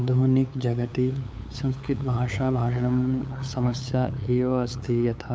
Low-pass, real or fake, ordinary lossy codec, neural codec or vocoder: none; fake; none; codec, 16 kHz, 8 kbps, FunCodec, trained on LibriTTS, 25 frames a second